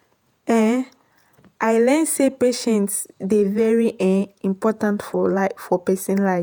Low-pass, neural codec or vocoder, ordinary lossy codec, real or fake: none; vocoder, 48 kHz, 128 mel bands, Vocos; none; fake